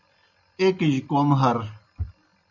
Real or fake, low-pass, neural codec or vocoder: real; 7.2 kHz; none